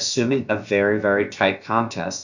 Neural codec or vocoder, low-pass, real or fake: codec, 16 kHz, about 1 kbps, DyCAST, with the encoder's durations; 7.2 kHz; fake